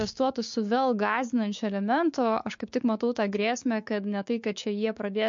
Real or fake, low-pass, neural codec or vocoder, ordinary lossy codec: fake; 7.2 kHz; codec, 16 kHz, 6 kbps, DAC; MP3, 48 kbps